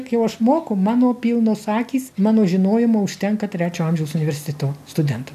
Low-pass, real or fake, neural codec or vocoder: 14.4 kHz; real; none